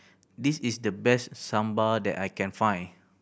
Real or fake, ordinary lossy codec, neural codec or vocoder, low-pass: real; none; none; none